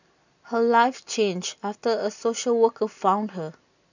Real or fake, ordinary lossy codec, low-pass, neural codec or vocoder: real; none; 7.2 kHz; none